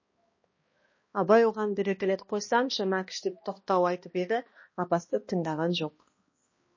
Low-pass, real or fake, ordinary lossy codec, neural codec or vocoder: 7.2 kHz; fake; MP3, 32 kbps; codec, 16 kHz, 1 kbps, X-Codec, HuBERT features, trained on balanced general audio